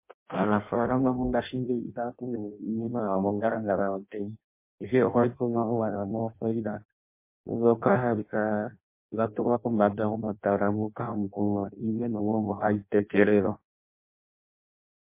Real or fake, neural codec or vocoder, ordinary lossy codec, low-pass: fake; codec, 16 kHz in and 24 kHz out, 0.6 kbps, FireRedTTS-2 codec; MP3, 24 kbps; 3.6 kHz